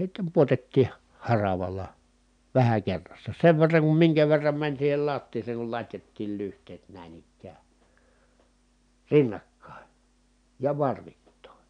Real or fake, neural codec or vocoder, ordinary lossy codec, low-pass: real; none; none; 9.9 kHz